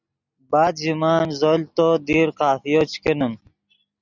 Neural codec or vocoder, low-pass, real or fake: none; 7.2 kHz; real